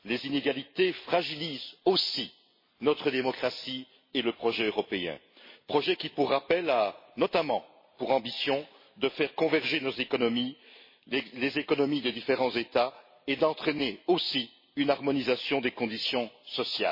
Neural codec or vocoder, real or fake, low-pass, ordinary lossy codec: none; real; 5.4 kHz; MP3, 24 kbps